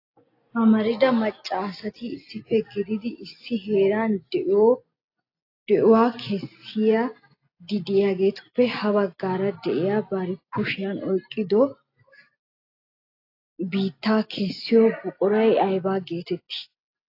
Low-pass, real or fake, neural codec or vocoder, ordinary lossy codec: 5.4 kHz; real; none; AAC, 32 kbps